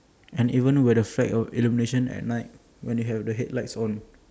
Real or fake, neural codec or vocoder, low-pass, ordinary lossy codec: real; none; none; none